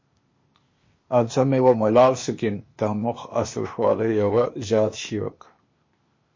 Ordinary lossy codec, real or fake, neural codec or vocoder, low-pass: MP3, 32 kbps; fake; codec, 16 kHz, 0.8 kbps, ZipCodec; 7.2 kHz